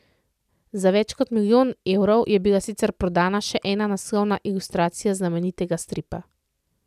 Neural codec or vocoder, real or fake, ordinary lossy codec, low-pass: none; real; none; 14.4 kHz